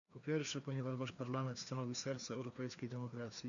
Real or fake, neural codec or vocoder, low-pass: fake; codec, 16 kHz, 2 kbps, FreqCodec, larger model; 7.2 kHz